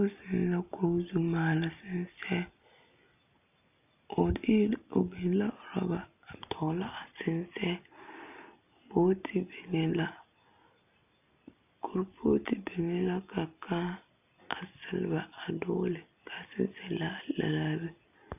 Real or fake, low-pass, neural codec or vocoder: real; 3.6 kHz; none